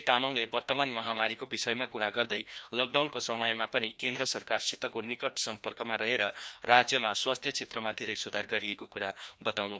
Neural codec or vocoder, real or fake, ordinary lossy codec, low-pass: codec, 16 kHz, 1 kbps, FreqCodec, larger model; fake; none; none